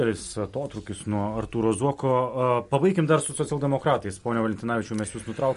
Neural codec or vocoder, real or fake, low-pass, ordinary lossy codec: none; real; 10.8 kHz; MP3, 48 kbps